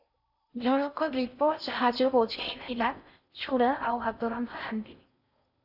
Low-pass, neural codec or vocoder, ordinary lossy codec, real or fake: 5.4 kHz; codec, 16 kHz in and 24 kHz out, 0.6 kbps, FocalCodec, streaming, 4096 codes; AAC, 48 kbps; fake